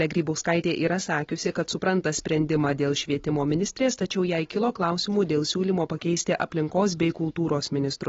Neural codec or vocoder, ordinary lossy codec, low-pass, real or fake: none; AAC, 24 kbps; 7.2 kHz; real